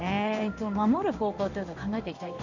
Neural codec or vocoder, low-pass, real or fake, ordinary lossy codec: codec, 16 kHz in and 24 kHz out, 1 kbps, XY-Tokenizer; 7.2 kHz; fake; none